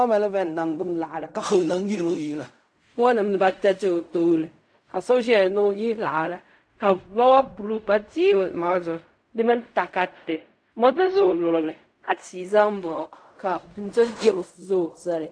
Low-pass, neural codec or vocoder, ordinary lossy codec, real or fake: 9.9 kHz; codec, 16 kHz in and 24 kHz out, 0.4 kbps, LongCat-Audio-Codec, fine tuned four codebook decoder; AAC, 64 kbps; fake